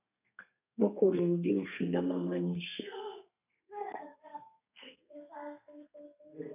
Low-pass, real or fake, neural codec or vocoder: 3.6 kHz; fake; codec, 32 kHz, 1.9 kbps, SNAC